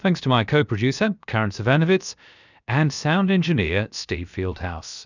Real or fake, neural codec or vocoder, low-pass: fake; codec, 16 kHz, 0.7 kbps, FocalCodec; 7.2 kHz